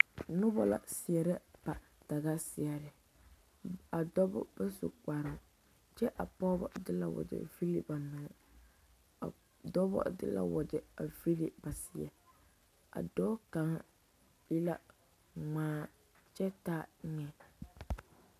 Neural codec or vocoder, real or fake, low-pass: none; real; 14.4 kHz